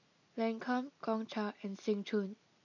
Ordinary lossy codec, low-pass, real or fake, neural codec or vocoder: none; 7.2 kHz; real; none